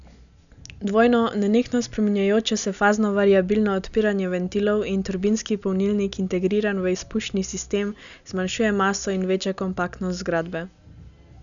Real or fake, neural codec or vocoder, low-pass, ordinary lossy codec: real; none; 7.2 kHz; none